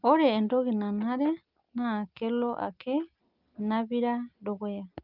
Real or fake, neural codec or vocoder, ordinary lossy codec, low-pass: real; none; Opus, 24 kbps; 5.4 kHz